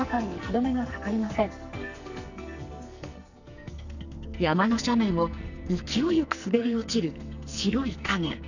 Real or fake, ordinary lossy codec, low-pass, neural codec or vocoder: fake; none; 7.2 kHz; codec, 44.1 kHz, 2.6 kbps, SNAC